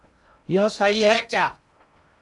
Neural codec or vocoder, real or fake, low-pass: codec, 16 kHz in and 24 kHz out, 0.6 kbps, FocalCodec, streaming, 2048 codes; fake; 10.8 kHz